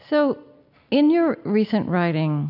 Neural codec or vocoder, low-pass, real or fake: none; 5.4 kHz; real